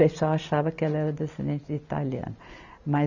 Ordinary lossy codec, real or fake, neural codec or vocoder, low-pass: none; real; none; 7.2 kHz